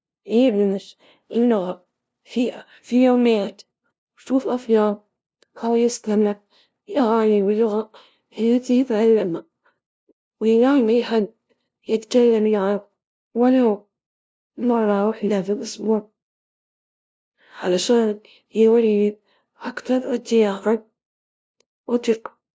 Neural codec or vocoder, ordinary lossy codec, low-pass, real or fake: codec, 16 kHz, 0.5 kbps, FunCodec, trained on LibriTTS, 25 frames a second; none; none; fake